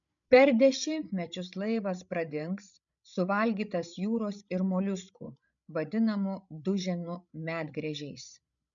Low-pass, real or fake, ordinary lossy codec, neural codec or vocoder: 7.2 kHz; fake; MP3, 96 kbps; codec, 16 kHz, 16 kbps, FreqCodec, larger model